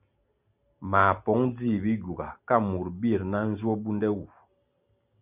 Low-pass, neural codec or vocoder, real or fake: 3.6 kHz; none; real